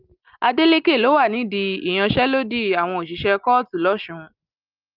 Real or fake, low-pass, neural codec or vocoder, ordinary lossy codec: real; 5.4 kHz; none; Opus, 24 kbps